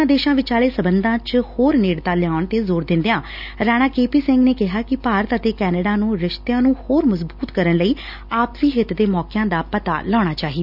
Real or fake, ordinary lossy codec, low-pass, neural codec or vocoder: real; none; 5.4 kHz; none